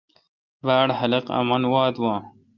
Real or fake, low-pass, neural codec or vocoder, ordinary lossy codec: real; 7.2 kHz; none; Opus, 32 kbps